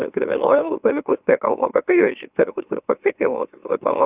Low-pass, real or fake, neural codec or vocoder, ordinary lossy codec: 3.6 kHz; fake; autoencoder, 44.1 kHz, a latent of 192 numbers a frame, MeloTTS; Opus, 64 kbps